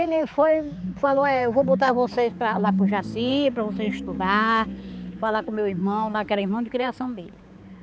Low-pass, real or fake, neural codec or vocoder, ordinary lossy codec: none; fake; codec, 16 kHz, 4 kbps, X-Codec, HuBERT features, trained on balanced general audio; none